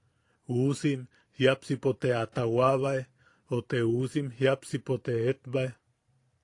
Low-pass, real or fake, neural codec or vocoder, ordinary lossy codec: 10.8 kHz; fake; vocoder, 44.1 kHz, 128 mel bands every 512 samples, BigVGAN v2; AAC, 48 kbps